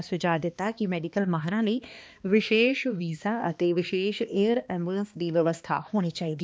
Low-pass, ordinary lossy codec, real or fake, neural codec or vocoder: none; none; fake; codec, 16 kHz, 2 kbps, X-Codec, HuBERT features, trained on balanced general audio